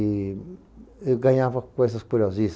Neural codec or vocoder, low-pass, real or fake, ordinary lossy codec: none; none; real; none